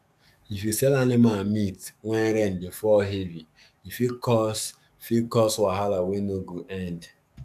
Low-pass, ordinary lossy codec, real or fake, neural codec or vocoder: 14.4 kHz; AAC, 96 kbps; fake; codec, 44.1 kHz, 7.8 kbps, DAC